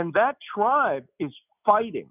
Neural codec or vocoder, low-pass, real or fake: none; 3.6 kHz; real